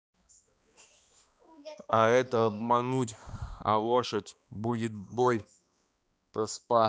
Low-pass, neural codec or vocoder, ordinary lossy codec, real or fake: none; codec, 16 kHz, 2 kbps, X-Codec, HuBERT features, trained on balanced general audio; none; fake